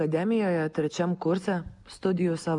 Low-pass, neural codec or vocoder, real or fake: 10.8 kHz; none; real